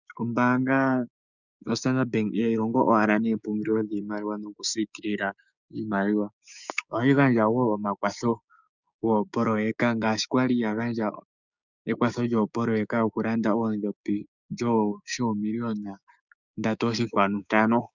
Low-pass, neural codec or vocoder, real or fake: 7.2 kHz; codec, 16 kHz, 6 kbps, DAC; fake